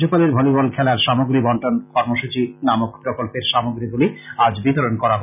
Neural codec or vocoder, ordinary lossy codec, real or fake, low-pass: none; none; real; 3.6 kHz